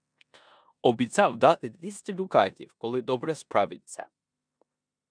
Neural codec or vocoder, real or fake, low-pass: codec, 16 kHz in and 24 kHz out, 0.9 kbps, LongCat-Audio-Codec, four codebook decoder; fake; 9.9 kHz